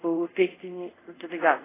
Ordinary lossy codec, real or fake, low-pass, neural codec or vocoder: AAC, 16 kbps; fake; 3.6 kHz; codec, 24 kHz, 0.5 kbps, DualCodec